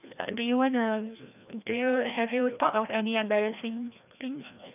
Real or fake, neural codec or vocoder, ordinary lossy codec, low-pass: fake; codec, 16 kHz, 1 kbps, FreqCodec, larger model; none; 3.6 kHz